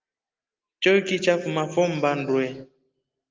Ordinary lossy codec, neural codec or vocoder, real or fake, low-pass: Opus, 24 kbps; none; real; 7.2 kHz